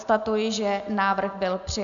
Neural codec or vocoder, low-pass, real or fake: none; 7.2 kHz; real